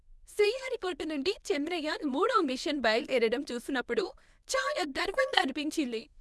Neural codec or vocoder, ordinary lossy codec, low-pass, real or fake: codec, 24 kHz, 0.9 kbps, WavTokenizer, medium speech release version 1; none; none; fake